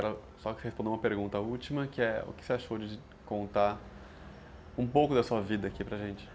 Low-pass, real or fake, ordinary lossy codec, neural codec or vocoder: none; real; none; none